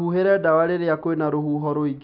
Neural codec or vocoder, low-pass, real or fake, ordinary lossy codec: none; 5.4 kHz; real; none